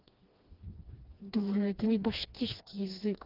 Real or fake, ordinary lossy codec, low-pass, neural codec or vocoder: fake; Opus, 24 kbps; 5.4 kHz; codec, 16 kHz, 2 kbps, FreqCodec, smaller model